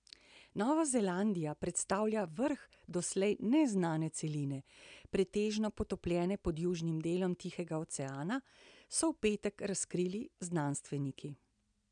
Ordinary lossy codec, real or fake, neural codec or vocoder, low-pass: none; real; none; 9.9 kHz